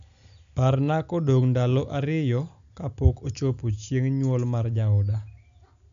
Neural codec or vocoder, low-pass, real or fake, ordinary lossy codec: none; 7.2 kHz; real; none